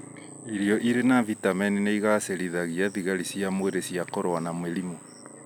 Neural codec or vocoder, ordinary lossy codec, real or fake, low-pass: none; none; real; none